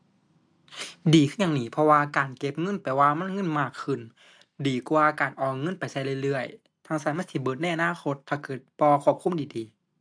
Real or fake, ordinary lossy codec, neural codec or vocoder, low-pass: real; AAC, 64 kbps; none; 9.9 kHz